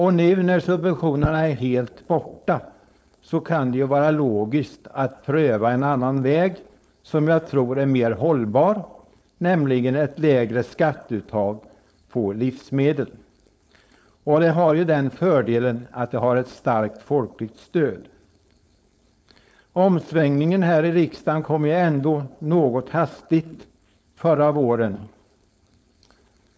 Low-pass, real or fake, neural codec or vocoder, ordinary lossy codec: none; fake; codec, 16 kHz, 4.8 kbps, FACodec; none